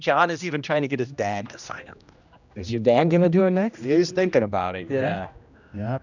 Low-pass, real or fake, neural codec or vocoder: 7.2 kHz; fake; codec, 16 kHz, 1 kbps, X-Codec, HuBERT features, trained on general audio